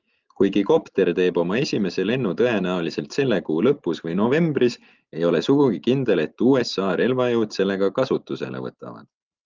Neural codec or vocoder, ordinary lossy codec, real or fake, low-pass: none; Opus, 24 kbps; real; 7.2 kHz